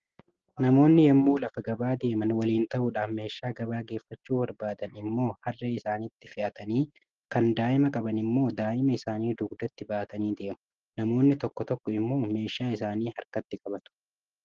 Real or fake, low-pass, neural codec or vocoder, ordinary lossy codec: real; 7.2 kHz; none; Opus, 16 kbps